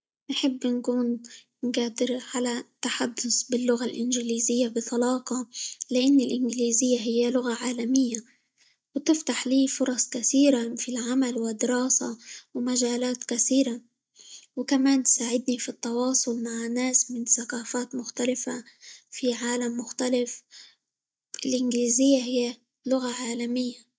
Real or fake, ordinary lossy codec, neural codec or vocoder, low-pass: real; none; none; none